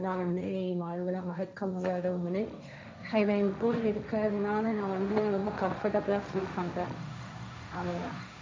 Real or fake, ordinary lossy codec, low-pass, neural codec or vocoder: fake; none; none; codec, 16 kHz, 1.1 kbps, Voila-Tokenizer